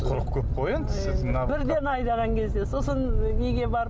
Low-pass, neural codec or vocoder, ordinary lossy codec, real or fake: none; none; none; real